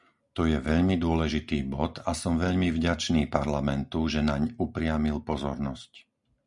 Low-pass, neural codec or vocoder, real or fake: 10.8 kHz; none; real